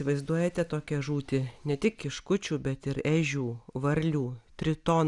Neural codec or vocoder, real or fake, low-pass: none; real; 10.8 kHz